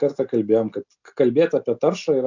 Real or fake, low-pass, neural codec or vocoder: real; 7.2 kHz; none